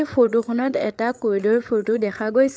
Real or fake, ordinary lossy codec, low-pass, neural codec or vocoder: fake; none; none; codec, 16 kHz, 8 kbps, FreqCodec, larger model